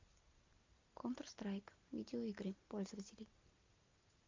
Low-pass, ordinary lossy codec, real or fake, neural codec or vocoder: 7.2 kHz; MP3, 64 kbps; real; none